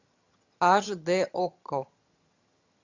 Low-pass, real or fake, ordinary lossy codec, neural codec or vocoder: 7.2 kHz; fake; Opus, 64 kbps; vocoder, 22.05 kHz, 80 mel bands, HiFi-GAN